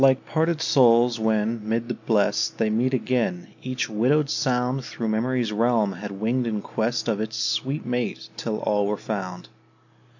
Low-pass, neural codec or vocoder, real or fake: 7.2 kHz; none; real